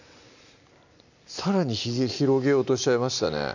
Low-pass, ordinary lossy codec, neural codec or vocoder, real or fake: 7.2 kHz; none; none; real